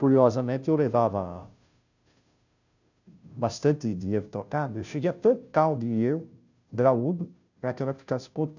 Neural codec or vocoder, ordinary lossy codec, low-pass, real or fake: codec, 16 kHz, 0.5 kbps, FunCodec, trained on Chinese and English, 25 frames a second; none; 7.2 kHz; fake